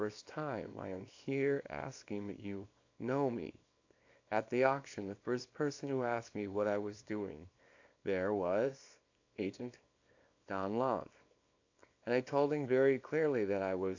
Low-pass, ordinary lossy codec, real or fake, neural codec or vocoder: 7.2 kHz; AAC, 48 kbps; fake; codec, 24 kHz, 0.9 kbps, WavTokenizer, small release